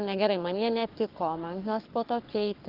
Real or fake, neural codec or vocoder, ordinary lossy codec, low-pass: fake; codec, 44.1 kHz, 3.4 kbps, Pupu-Codec; Opus, 32 kbps; 5.4 kHz